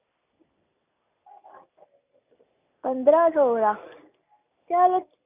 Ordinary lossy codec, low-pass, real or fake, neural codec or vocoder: none; 3.6 kHz; real; none